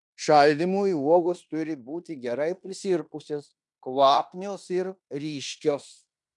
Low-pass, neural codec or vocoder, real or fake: 10.8 kHz; codec, 16 kHz in and 24 kHz out, 0.9 kbps, LongCat-Audio-Codec, fine tuned four codebook decoder; fake